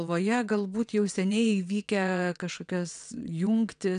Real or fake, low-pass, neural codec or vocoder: fake; 9.9 kHz; vocoder, 22.05 kHz, 80 mel bands, WaveNeXt